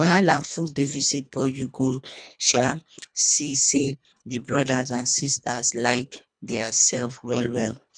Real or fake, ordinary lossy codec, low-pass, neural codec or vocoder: fake; none; 9.9 kHz; codec, 24 kHz, 1.5 kbps, HILCodec